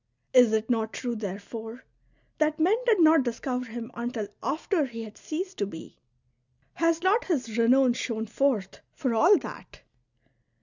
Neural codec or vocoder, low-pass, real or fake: none; 7.2 kHz; real